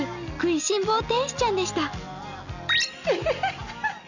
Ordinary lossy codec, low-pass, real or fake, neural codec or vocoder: none; 7.2 kHz; real; none